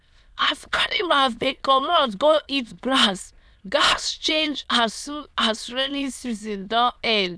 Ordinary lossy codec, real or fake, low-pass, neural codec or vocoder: none; fake; none; autoencoder, 22.05 kHz, a latent of 192 numbers a frame, VITS, trained on many speakers